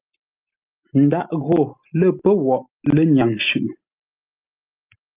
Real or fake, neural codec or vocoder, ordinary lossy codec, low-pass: real; none; Opus, 24 kbps; 3.6 kHz